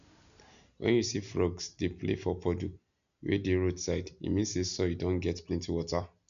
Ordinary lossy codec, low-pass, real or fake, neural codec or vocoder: none; 7.2 kHz; real; none